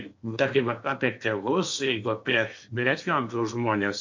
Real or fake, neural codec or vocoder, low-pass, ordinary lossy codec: fake; codec, 16 kHz in and 24 kHz out, 0.8 kbps, FocalCodec, streaming, 65536 codes; 7.2 kHz; MP3, 48 kbps